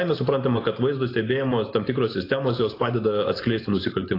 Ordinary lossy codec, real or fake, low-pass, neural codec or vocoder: AAC, 24 kbps; real; 5.4 kHz; none